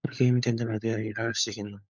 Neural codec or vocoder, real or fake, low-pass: codec, 16 kHz, 16 kbps, FunCodec, trained on LibriTTS, 50 frames a second; fake; 7.2 kHz